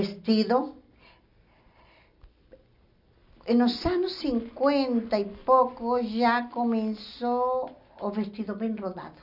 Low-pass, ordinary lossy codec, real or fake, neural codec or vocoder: 5.4 kHz; none; real; none